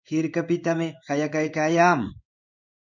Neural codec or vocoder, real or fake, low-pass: codec, 16 kHz in and 24 kHz out, 1 kbps, XY-Tokenizer; fake; 7.2 kHz